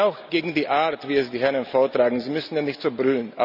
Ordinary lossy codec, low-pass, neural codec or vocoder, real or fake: none; 5.4 kHz; none; real